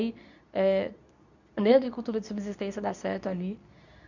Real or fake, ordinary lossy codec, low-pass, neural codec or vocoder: fake; none; 7.2 kHz; codec, 24 kHz, 0.9 kbps, WavTokenizer, medium speech release version 2